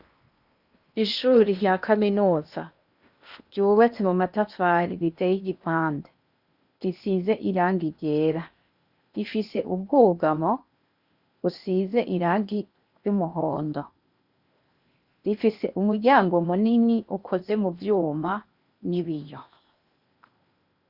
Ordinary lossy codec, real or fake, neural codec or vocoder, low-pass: Opus, 64 kbps; fake; codec, 16 kHz in and 24 kHz out, 0.8 kbps, FocalCodec, streaming, 65536 codes; 5.4 kHz